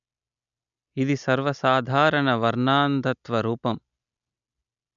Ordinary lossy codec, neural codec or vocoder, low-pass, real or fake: MP3, 96 kbps; none; 7.2 kHz; real